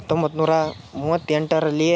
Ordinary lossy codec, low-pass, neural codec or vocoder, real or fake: none; none; none; real